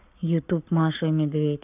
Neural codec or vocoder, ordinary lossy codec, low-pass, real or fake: codec, 44.1 kHz, 7.8 kbps, Pupu-Codec; none; 3.6 kHz; fake